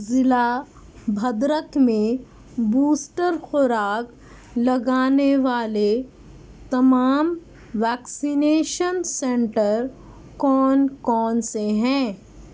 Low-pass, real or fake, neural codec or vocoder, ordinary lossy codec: none; real; none; none